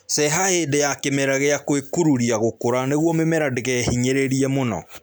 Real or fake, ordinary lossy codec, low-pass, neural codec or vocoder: real; none; none; none